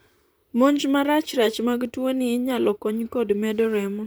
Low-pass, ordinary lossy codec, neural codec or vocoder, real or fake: none; none; vocoder, 44.1 kHz, 128 mel bands, Pupu-Vocoder; fake